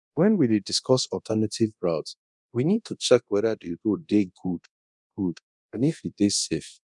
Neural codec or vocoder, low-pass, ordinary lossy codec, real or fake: codec, 24 kHz, 0.9 kbps, DualCodec; 10.8 kHz; none; fake